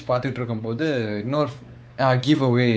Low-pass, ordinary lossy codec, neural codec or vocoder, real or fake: none; none; codec, 16 kHz, 4 kbps, X-Codec, WavLM features, trained on Multilingual LibriSpeech; fake